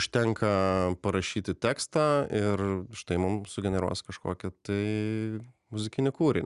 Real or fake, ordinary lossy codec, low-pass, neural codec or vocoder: real; Opus, 64 kbps; 10.8 kHz; none